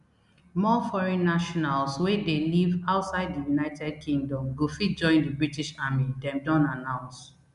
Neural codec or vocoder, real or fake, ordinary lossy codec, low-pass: none; real; MP3, 96 kbps; 10.8 kHz